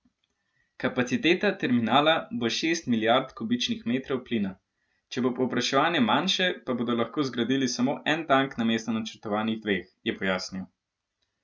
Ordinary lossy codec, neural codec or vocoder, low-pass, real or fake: none; none; none; real